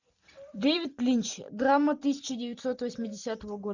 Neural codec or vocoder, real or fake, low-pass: vocoder, 44.1 kHz, 128 mel bands every 256 samples, BigVGAN v2; fake; 7.2 kHz